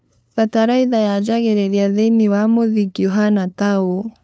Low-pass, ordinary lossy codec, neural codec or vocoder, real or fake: none; none; codec, 16 kHz, 2 kbps, FunCodec, trained on LibriTTS, 25 frames a second; fake